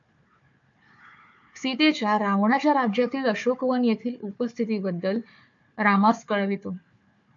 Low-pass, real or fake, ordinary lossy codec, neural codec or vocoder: 7.2 kHz; fake; AAC, 64 kbps; codec, 16 kHz, 4 kbps, FunCodec, trained on Chinese and English, 50 frames a second